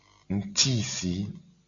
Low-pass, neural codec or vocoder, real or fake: 7.2 kHz; none; real